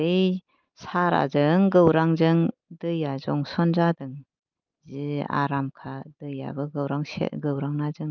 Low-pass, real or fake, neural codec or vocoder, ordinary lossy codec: 7.2 kHz; real; none; Opus, 32 kbps